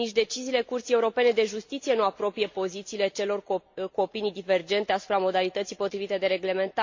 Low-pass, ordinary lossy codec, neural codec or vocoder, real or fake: 7.2 kHz; MP3, 48 kbps; none; real